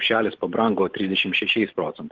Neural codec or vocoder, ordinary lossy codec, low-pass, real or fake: vocoder, 44.1 kHz, 128 mel bands every 512 samples, BigVGAN v2; Opus, 16 kbps; 7.2 kHz; fake